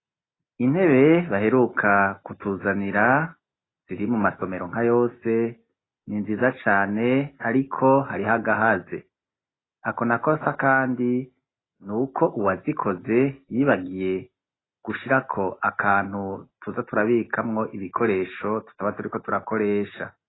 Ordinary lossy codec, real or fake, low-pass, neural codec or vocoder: AAC, 16 kbps; real; 7.2 kHz; none